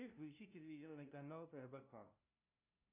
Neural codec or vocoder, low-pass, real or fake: codec, 16 kHz, 0.5 kbps, FunCodec, trained on LibriTTS, 25 frames a second; 3.6 kHz; fake